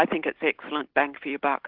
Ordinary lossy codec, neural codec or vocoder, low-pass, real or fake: Opus, 16 kbps; none; 5.4 kHz; real